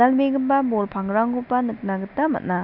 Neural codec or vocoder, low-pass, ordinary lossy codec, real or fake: none; 5.4 kHz; none; real